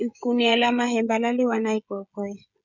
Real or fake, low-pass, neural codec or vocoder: fake; 7.2 kHz; codec, 16 kHz, 16 kbps, FreqCodec, smaller model